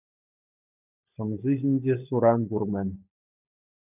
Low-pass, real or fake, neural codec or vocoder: 3.6 kHz; fake; codec, 16 kHz, 16 kbps, FunCodec, trained on LibriTTS, 50 frames a second